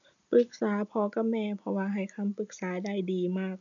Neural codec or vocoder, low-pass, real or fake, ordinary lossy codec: none; 7.2 kHz; real; none